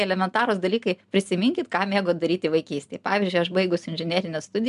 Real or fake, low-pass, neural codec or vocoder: real; 10.8 kHz; none